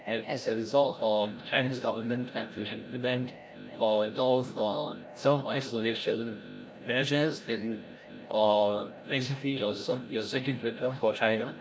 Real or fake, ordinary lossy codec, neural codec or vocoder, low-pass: fake; none; codec, 16 kHz, 0.5 kbps, FreqCodec, larger model; none